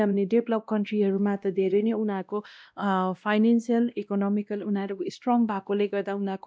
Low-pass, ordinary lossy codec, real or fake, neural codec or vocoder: none; none; fake; codec, 16 kHz, 1 kbps, X-Codec, WavLM features, trained on Multilingual LibriSpeech